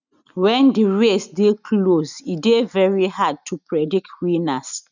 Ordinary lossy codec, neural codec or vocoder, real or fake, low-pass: none; none; real; 7.2 kHz